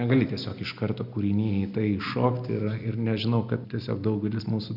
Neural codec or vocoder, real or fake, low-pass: none; real; 5.4 kHz